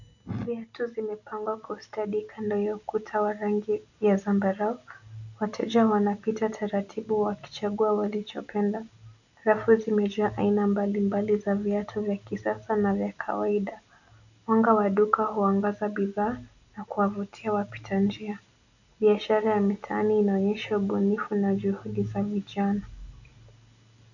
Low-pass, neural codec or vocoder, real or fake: 7.2 kHz; none; real